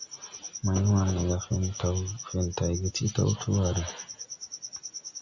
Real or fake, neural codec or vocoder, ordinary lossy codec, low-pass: real; none; MP3, 64 kbps; 7.2 kHz